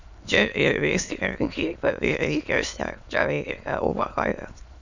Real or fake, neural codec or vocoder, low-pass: fake; autoencoder, 22.05 kHz, a latent of 192 numbers a frame, VITS, trained on many speakers; 7.2 kHz